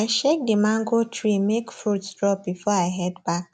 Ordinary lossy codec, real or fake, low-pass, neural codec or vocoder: none; real; none; none